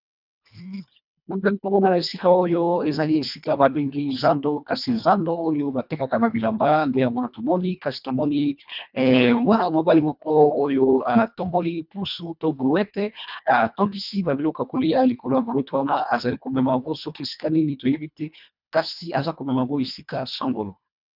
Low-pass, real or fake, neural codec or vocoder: 5.4 kHz; fake; codec, 24 kHz, 1.5 kbps, HILCodec